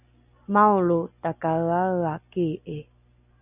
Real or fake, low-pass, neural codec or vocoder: real; 3.6 kHz; none